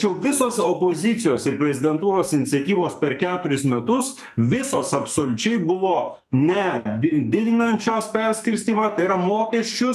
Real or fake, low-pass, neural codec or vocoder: fake; 14.4 kHz; codec, 44.1 kHz, 2.6 kbps, SNAC